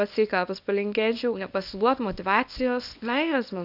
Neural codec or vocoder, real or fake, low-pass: codec, 24 kHz, 0.9 kbps, WavTokenizer, small release; fake; 5.4 kHz